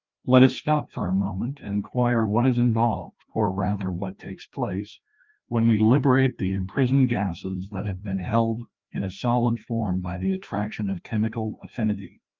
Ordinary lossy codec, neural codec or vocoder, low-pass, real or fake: Opus, 24 kbps; codec, 16 kHz, 1 kbps, FreqCodec, larger model; 7.2 kHz; fake